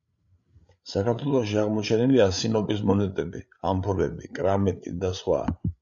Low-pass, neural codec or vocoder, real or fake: 7.2 kHz; codec, 16 kHz, 8 kbps, FreqCodec, larger model; fake